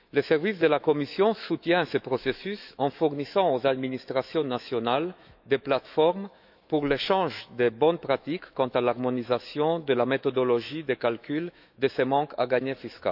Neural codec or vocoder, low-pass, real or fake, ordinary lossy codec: autoencoder, 48 kHz, 128 numbers a frame, DAC-VAE, trained on Japanese speech; 5.4 kHz; fake; none